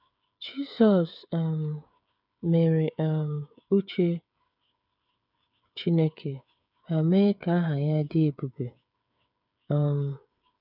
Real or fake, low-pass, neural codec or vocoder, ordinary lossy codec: fake; 5.4 kHz; codec, 16 kHz, 8 kbps, FreqCodec, smaller model; none